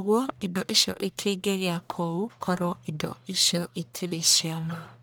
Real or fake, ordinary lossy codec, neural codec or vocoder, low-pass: fake; none; codec, 44.1 kHz, 1.7 kbps, Pupu-Codec; none